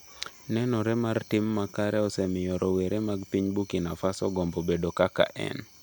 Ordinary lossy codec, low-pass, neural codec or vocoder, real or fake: none; none; none; real